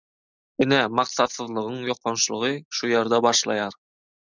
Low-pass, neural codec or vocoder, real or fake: 7.2 kHz; none; real